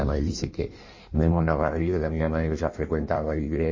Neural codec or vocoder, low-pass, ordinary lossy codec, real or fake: codec, 16 kHz in and 24 kHz out, 1.1 kbps, FireRedTTS-2 codec; 7.2 kHz; MP3, 32 kbps; fake